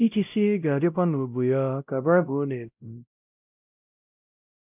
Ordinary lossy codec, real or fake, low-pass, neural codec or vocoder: none; fake; 3.6 kHz; codec, 16 kHz, 0.5 kbps, X-Codec, WavLM features, trained on Multilingual LibriSpeech